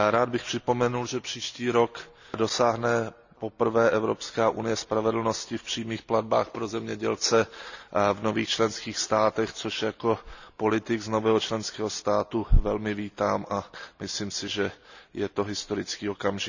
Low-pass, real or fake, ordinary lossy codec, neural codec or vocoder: 7.2 kHz; real; none; none